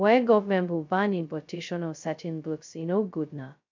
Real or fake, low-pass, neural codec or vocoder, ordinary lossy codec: fake; 7.2 kHz; codec, 16 kHz, 0.2 kbps, FocalCodec; MP3, 64 kbps